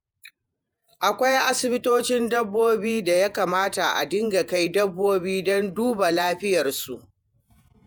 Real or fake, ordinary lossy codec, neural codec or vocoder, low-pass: fake; none; vocoder, 48 kHz, 128 mel bands, Vocos; none